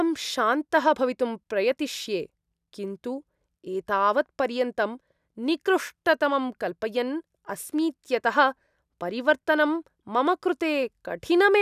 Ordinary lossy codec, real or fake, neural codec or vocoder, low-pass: none; real; none; 14.4 kHz